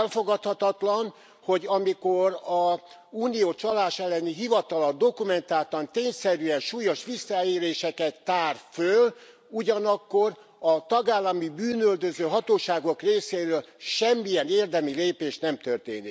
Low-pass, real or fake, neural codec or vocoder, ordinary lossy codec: none; real; none; none